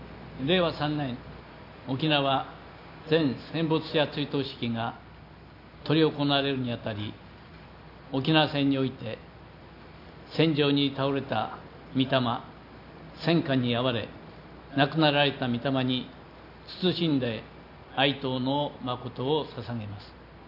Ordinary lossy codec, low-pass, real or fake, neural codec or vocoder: none; 5.4 kHz; real; none